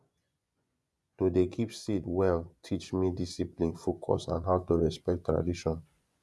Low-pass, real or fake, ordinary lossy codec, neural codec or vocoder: none; fake; none; vocoder, 24 kHz, 100 mel bands, Vocos